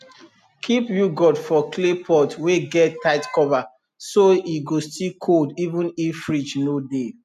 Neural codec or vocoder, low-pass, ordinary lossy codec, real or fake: none; 14.4 kHz; none; real